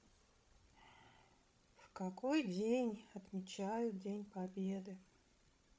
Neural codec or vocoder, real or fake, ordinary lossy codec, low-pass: codec, 16 kHz, 16 kbps, FreqCodec, larger model; fake; none; none